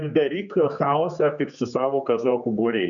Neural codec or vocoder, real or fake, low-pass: codec, 16 kHz, 4 kbps, X-Codec, HuBERT features, trained on general audio; fake; 7.2 kHz